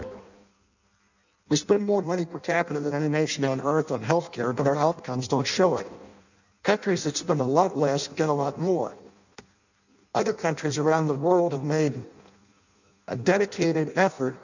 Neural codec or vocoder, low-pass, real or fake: codec, 16 kHz in and 24 kHz out, 0.6 kbps, FireRedTTS-2 codec; 7.2 kHz; fake